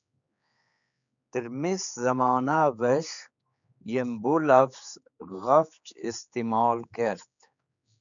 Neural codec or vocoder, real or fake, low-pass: codec, 16 kHz, 4 kbps, X-Codec, HuBERT features, trained on general audio; fake; 7.2 kHz